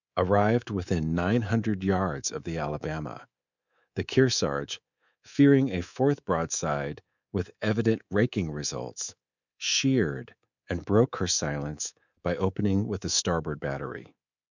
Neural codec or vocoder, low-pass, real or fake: codec, 24 kHz, 3.1 kbps, DualCodec; 7.2 kHz; fake